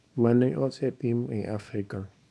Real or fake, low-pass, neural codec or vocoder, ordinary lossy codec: fake; none; codec, 24 kHz, 0.9 kbps, WavTokenizer, small release; none